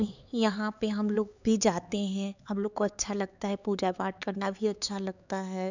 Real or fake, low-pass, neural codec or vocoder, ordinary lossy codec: fake; 7.2 kHz; codec, 16 kHz, 4 kbps, X-Codec, HuBERT features, trained on LibriSpeech; none